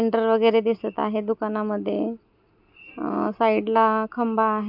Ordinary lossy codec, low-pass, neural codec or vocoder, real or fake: MP3, 48 kbps; 5.4 kHz; none; real